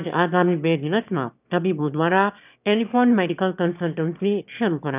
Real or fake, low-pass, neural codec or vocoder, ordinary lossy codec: fake; 3.6 kHz; autoencoder, 22.05 kHz, a latent of 192 numbers a frame, VITS, trained on one speaker; none